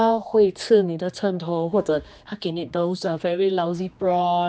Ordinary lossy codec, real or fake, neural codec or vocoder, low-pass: none; fake; codec, 16 kHz, 2 kbps, X-Codec, HuBERT features, trained on general audio; none